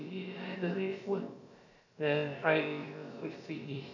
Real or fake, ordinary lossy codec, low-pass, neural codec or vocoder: fake; none; 7.2 kHz; codec, 16 kHz, about 1 kbps, DyCAST, with the encoder's durations